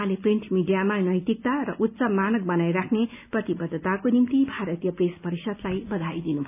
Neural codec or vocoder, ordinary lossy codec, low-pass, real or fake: none; none; 3.6 kHz; real